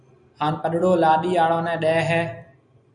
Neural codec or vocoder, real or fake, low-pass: none; real; 9.9 kHz